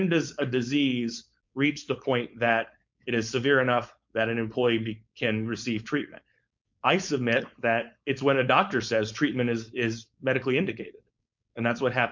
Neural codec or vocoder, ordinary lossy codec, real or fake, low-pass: codec, 16 kHz, 4.8 kbps, FACodec; MP3, 64 kbps; fake; 7.2 kHz